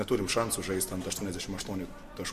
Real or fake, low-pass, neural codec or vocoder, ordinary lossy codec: real; 14.4 kHz; none; MP3, 64 kbps